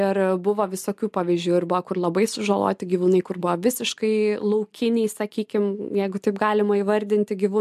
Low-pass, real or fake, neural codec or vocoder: 14.4 kHz; real; none